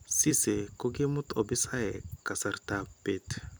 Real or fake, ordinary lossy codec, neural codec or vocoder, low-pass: fake; none; vocoder, 44.1 kHz, 128 mel bands every 256 samples, BigVGAN v2; none